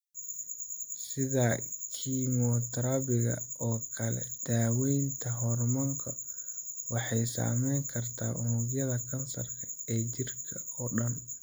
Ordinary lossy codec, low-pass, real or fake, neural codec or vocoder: none; none; real; none